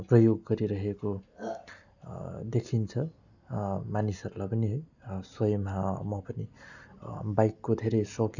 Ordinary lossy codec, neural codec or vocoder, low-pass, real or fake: none; none; 7.2 kHz; real